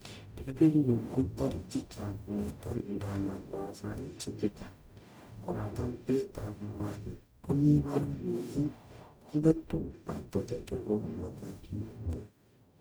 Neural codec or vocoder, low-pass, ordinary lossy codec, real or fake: codec, 44.1 kHz, 0.9 kbps, DAC; none; none; fake